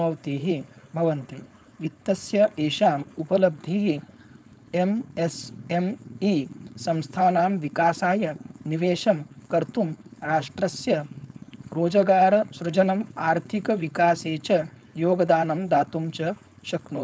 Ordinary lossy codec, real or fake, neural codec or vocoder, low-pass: none; fake; codec, 16 kHz, 4.8 kbps, FACodec; none